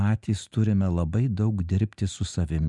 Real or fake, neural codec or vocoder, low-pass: real; none; 10.8 kHz